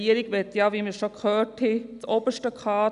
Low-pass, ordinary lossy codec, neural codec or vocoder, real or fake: 10.8 kHz; none; none; real